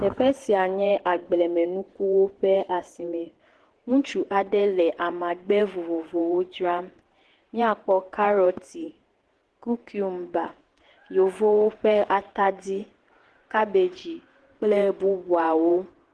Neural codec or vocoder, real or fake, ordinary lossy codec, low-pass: vocoder, 48 kHz, 128 mel bands, Vocos; fake; Opus, 16 kbps; 10.8 kHz